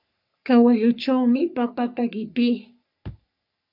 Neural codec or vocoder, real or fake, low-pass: codec, 44.1 kHz, 3.4 kbps, Pupu-Codec; fake; 5.4 kHz